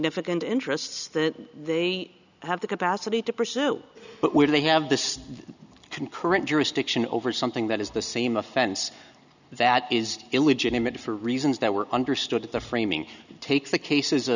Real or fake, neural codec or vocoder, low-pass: real; none; 7.2 kHz